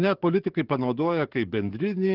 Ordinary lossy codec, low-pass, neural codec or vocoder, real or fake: Opus, 16 kbps; 5.4 kHz; codec, 44.1 kHz, 7.8 kbps, DAC; fake